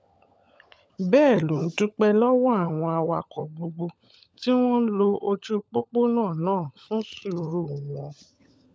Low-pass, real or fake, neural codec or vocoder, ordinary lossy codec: none; fake; codec, 16 kHz, 16 kbps, FunCodec, trained on LibriTTS, 50 frames a second; none